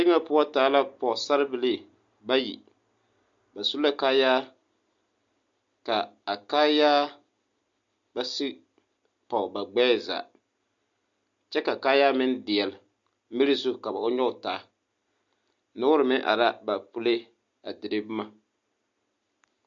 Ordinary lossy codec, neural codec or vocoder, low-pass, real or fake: MP3, 48 kbps; none; 7.2 kHz; real